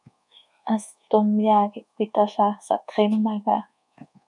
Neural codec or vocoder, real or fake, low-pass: codec, 24 kHz, 1.2 kbps, DualCodec; fake; 10.8 kHz